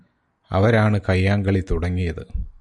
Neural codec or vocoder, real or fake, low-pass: none; real; 10.8 kHz